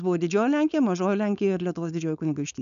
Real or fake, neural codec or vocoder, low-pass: fake; codec, 16 kHz, 4.8 kbps, FACodec; 7.2 kHz